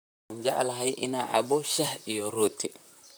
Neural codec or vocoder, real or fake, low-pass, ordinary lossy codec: vocoder, 44.1 kHz, 128 mel bands, Pupu-Vocoder; fake; none; none